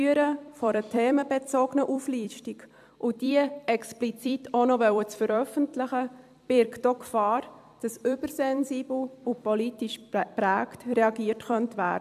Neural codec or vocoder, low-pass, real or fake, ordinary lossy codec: vocoder, 44.1 kHz, 128 mel bands every 256 samples, BigVGAN v2; 14.4 kHz; fake; AAC, 96 kbps